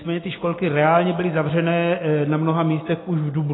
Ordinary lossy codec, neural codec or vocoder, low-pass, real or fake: AAC, 16 kbps; none; 7.2 kHz; real